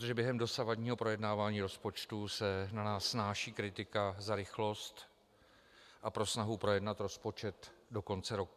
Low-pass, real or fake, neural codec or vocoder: 14.4 kHz; real; none